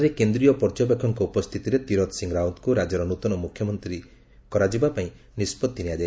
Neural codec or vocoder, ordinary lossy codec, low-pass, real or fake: none; none; none; real